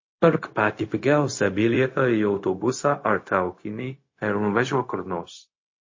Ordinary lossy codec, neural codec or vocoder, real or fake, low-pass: MP3, 32 kbps; codec, 16 kHz, 0.4 kbps, LongCat-Audio-Codec; fake; 7.2 kHz